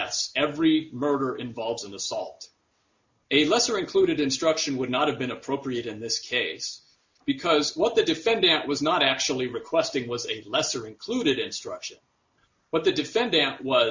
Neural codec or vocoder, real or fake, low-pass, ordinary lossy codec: none; real; 7.2 kHz; MP3, 64 kbps